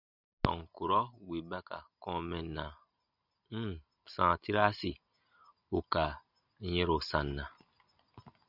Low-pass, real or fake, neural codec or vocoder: 5.4 kHz; real; none